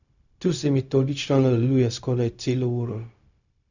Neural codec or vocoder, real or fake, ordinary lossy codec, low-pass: codec, 16 kHz, 0.4 kbps, LongCat-Audio-Codec; fake; none; 7.2 kHz